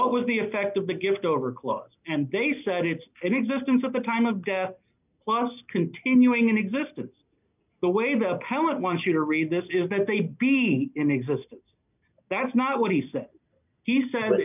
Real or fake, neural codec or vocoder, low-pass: real; none; 3.6 kHz